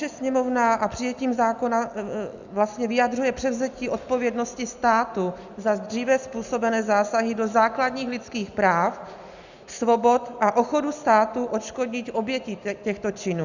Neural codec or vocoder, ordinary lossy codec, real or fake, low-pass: none; Opus, 64 kbps; real; 7.2 kHz